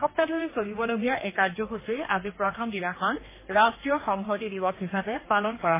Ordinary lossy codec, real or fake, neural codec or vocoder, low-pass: MP3, 16 kbps; fake; codec, 16 kHz, 2 kbps, X-Codec, HuBERT features, trained on general audio; 3.6 kHz